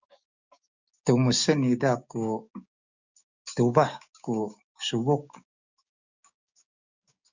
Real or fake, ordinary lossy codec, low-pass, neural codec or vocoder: fake; Opus, 64 kbps; 7.2 kHz; codec, 16 kHz, 6 kbps, DAC